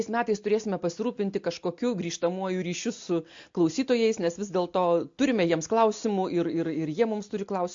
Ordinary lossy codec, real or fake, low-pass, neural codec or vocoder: MP3, 48 kbps; real; 7.2 kHz; none